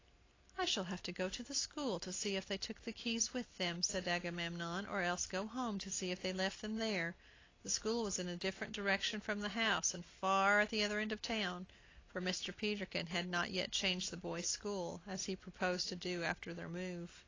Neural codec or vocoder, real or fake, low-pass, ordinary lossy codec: none; real; 7.2 kHz; AAC, 32 kbps